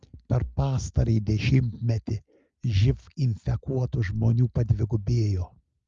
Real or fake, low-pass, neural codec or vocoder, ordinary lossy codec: real; 7.2 kHz; none; Opus, 32 kbps